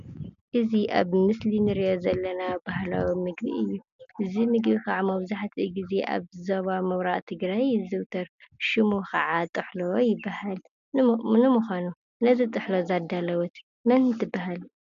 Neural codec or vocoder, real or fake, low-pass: none; real; 7.2 kHz